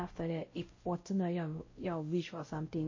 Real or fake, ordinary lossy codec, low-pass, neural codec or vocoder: fake; MP3, 32 kbps; 7.2 kHz; codec, 16 kHz, 0.5 kbps, X-Codec, WavLM features, trained on Multilingual LibriSpeech